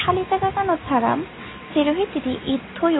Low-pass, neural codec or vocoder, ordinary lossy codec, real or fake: 7.2 kHz; none; AAC, 16 kbps; real